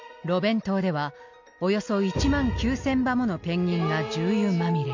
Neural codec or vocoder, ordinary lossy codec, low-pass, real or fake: none; MP3, 48 kbps; 7.2 kHz; real